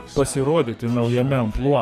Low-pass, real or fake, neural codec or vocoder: 14.4 kHz; fake; codec, 44.1 kHz, 2.6 kbps, SNAC